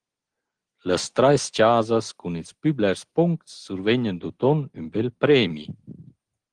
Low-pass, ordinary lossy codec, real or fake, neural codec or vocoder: 9.9 kHz; Opus, 16 kbps; real; none